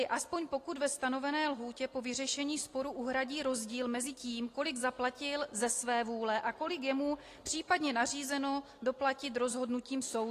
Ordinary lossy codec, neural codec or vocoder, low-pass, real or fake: AAC, 48 kbps; none; 14.4 kHz; real